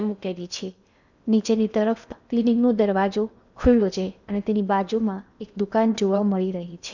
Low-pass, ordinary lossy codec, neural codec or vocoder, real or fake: 7.2 kHz; none; codec, 16 kHz in and 24 kHz out, 0.8 kbps, FocalCodec, streaming, 65536 codes; fake